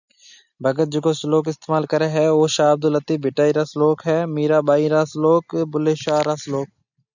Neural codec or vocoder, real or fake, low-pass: none; real; 7.2 kHz